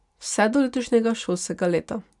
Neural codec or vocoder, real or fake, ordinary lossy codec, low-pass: none; real; none; 10.8 kHz